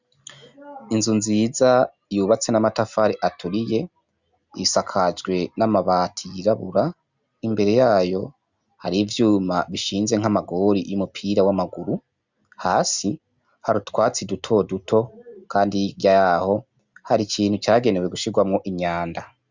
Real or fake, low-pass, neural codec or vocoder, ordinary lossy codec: real; 7.2 kHz; none; Opus, 64 kbps